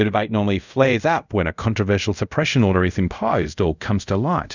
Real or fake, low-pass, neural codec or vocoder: fake; 7.2 kHz; codec, 24 kHz, 0.5 kbps, DualCodec